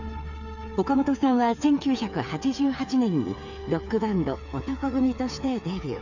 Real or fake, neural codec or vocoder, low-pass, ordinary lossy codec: fake; codec, 16 kHz, 8 kbps, FreqCodec, smaller model; 7.2 kHz; none